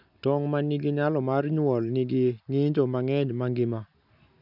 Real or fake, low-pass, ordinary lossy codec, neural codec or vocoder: real; 5.4 kHz; none; none